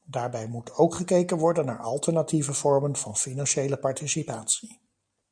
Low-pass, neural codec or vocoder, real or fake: 9.9 kHz; none; real